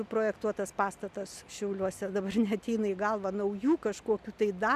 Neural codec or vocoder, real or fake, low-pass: none; real; 14.4 kHz